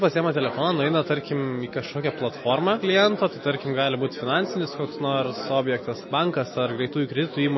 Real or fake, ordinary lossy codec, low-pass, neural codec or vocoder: real; MP3, 24 kbps; 7.2 kHz; none